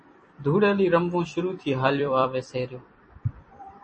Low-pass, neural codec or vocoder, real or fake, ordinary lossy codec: 10.8 kHz; vocoder, 44.1 kHz, 128 mel bands every 256 samples, BigVGAN v2; fake; MP3, 32 kbps